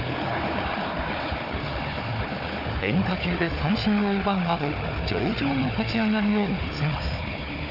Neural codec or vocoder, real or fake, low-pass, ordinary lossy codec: codec, 16 kHz, 4 kbps, FunCodec, trained on Chinese and English, 50 frames a second; fake; 5.4 kHz; none